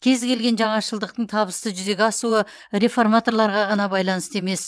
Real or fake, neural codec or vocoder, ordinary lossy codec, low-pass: fake; vocoder, 22.05 kHz, 80 mel bands, WaveNeXt; none; none